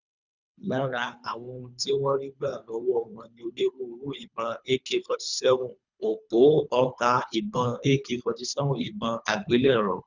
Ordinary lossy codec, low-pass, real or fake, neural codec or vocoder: none; 7.2 kHz; fake; codec, 24 kHz, 3 kbps, HILCodec